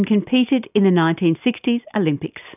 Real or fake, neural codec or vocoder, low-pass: real; none; 3.6 kHz